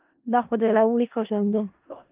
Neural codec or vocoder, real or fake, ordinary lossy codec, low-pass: codec, 16 kHz in and 24 kHz out, 0.4 kbps, LongCat-Audio-Codec, four codebook decoder; fake; Opus, 32 kbps; 3.6 kHz